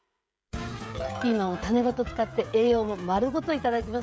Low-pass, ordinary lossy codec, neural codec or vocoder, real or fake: none; none; codec, 16 kHz, 16 kbps, FreqCodec, smaller model; fake